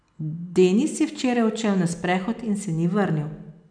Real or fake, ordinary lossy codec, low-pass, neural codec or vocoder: real; none; 9.9 kHz; none